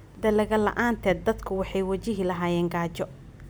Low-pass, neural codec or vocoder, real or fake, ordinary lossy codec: none; none; real; none